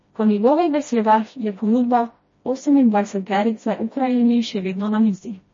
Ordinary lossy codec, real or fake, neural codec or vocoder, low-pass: MP3, 32 kbps; fake; codec, 16 kHz, 1 kbps, FreqCodec, smaller model; 7.2 kHz